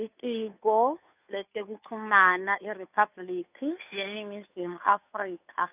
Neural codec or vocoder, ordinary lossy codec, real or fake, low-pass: codec, 16 kHz, 2 kbps, FunCodec, trained on Chinese and English, 25 frames a second; none; fake; 3.6 kHz